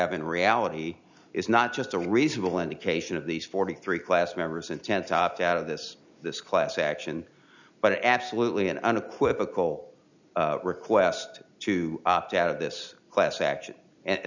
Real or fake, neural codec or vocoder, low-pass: real; none; 7.2 kHz